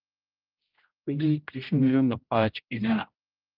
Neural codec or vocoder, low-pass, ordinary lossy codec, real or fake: codec, 16 kHz, 0.5 kbps, X-Codec, HuBERT features, trained on general audio; 5.4 kHz; Opus, 24 kbps; fake